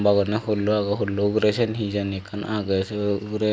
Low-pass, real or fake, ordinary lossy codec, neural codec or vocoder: none; real; none; none